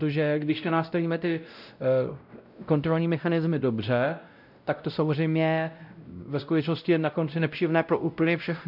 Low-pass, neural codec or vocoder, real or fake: 5.4 kHz; codec, 16 kHz, 0.5 kbps, X-Codec, WavLM features, trained on Multilingual LibriSpeech; fake